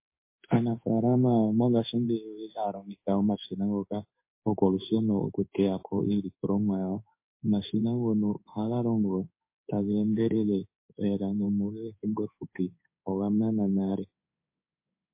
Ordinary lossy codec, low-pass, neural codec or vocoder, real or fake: MP3, 24 kbps; 3.6 kHz; codec, 16 kHz in and 24 kHz out, 1 kbps, XY-Tokenizer; fake